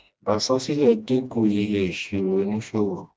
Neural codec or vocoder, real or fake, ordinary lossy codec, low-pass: codec, 16 kHz, 1 kbps, FreqCodec, smaller model; fake; none; none